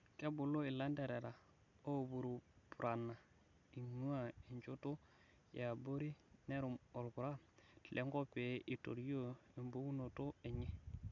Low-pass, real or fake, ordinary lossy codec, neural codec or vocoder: 7.2 kHz; real; none; none